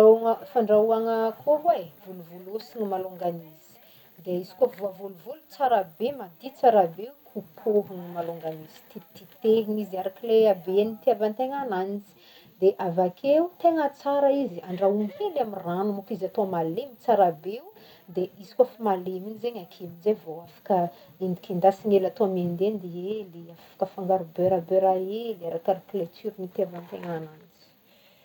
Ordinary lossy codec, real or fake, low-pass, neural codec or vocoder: none; real; 19.8 kHz; none